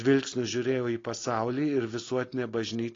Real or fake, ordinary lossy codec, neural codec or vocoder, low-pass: real; AAC, 32 kbps; none; 7.2 kHz